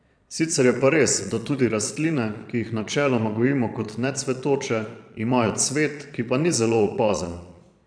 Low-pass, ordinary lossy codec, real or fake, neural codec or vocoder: 9.9 kHz; none; fake; vocoder, 22.05 kHz, 80 mel bands, Vocos